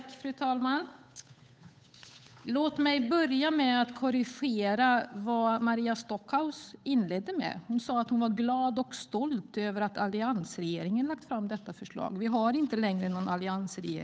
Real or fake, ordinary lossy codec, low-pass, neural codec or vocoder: fake; none; none; codec, 16 kHz, 8 kbps, FunCodec, trained on Chinese and English, 25 frames a second